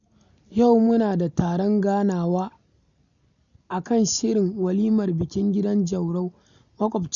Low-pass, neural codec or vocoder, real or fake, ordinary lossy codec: 7.2 kHz; none; real; none